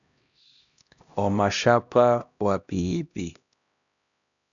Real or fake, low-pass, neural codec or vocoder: fake; 7.2 kHz; codec, 16 kHz, 1 kbps, X-Codec, HuBERT features, trained on LibriSpeech